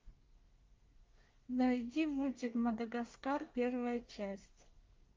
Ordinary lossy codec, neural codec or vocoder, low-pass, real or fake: Opus, 32 kbps; codec, 24 kHz, 1 kbps, SNAC; 7.2 kHz; fake